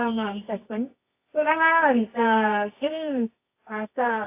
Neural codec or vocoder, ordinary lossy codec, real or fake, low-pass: codec, 24 kHz, 0.9 kbps, WavTokenizer, medium music audio release; AAC, 24 kbps; fake; 3.6 kHz